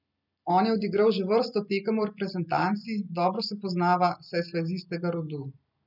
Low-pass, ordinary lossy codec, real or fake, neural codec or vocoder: 5.4 kHz; none; real; none